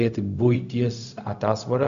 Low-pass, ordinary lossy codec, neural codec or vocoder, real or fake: 7.2 kHz; Opus, 64 kbps; codec, 16 kHz, 0.4 kbps, LongCat-Audio-Codec; fake